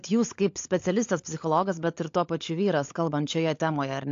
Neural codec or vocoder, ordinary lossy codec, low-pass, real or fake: codec, 16 kHz, 16 kbps, FunCodec, trained on LibriTTS, 50 frames a second; AAC, 48 kbps; 7.2 kHz; fake